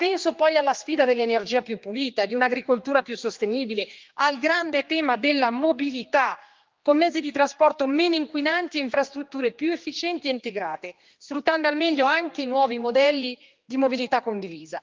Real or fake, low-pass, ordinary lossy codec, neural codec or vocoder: fake; 7.2 kHz; Opus, 32 kbps; codec, 16 kHz, 2 kbps, X-Codec, HuBERT features, trained on general audio